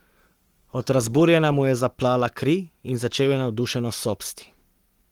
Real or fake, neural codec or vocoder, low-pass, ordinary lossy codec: fake; codec, 44.1 kHz, 7.8 kbps, Pupu-Codec; 19.8 kHz; Opus, 24 kbps